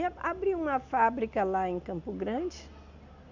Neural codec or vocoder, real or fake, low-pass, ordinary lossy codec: none; real; 7.2 kHz; none